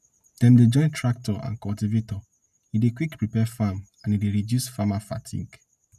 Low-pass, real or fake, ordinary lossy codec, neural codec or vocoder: 14.4 kHz; real; none; none